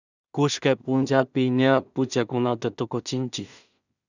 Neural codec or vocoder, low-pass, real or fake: codec, 16 kHz in and 24 kHz out, 0.4 kbps, LongCat-Audio-Codec, two codebook decoder; 7.2 kHz; fake